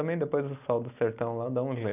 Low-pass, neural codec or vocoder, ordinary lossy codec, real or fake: 3.6 kHz; none; none; real